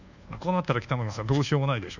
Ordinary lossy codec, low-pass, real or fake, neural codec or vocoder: none; 7.2 kHz; fake; codec, 24 kHz, 1.2 kbps, DualCodec